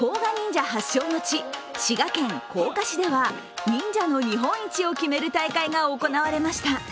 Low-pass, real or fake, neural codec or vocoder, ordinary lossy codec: none; real; none; none